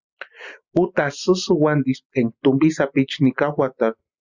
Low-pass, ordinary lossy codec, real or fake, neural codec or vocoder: 7.2 kHz; Opus, 64 kbps; real; none